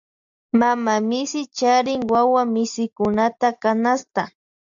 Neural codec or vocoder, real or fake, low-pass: none; real; 7.2 kHz